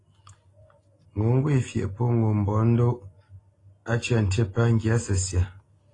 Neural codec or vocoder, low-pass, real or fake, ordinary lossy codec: none; 10.8 kHz; real; AAC, 32 kbps